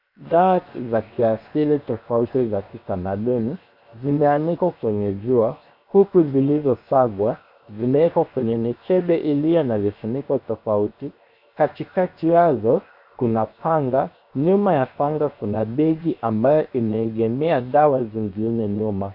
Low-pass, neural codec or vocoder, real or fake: 5.4 kHz; codec, 16 kHz, 0.7 kbps, FocalCodec; fake